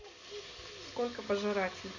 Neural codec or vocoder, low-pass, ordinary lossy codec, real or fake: none; 7.2 kHz; none; real